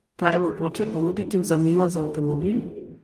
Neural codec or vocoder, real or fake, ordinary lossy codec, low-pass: codec, 44.1 kHz, 0.9 kbps, DAC; fake; Opus, 32 kbps; 14.4 kHz